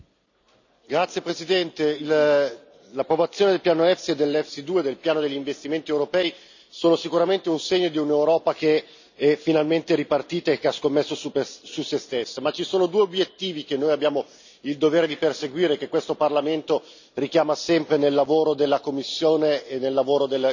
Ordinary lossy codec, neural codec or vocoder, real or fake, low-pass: none; none; real; 7.2 kHz